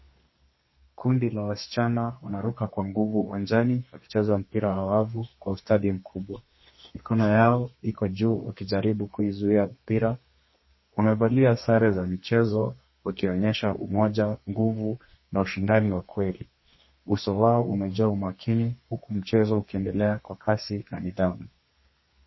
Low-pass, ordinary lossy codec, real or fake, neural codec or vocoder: 7.2 kHz; MP3, 24 kbps; fake; codec, 32 kHz, 1.9 kbps, SNAC